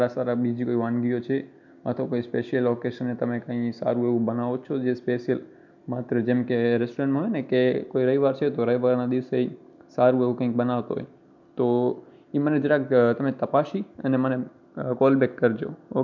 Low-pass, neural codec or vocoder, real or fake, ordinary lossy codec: 7.2 kHz; none; real; MP3, 64 kbps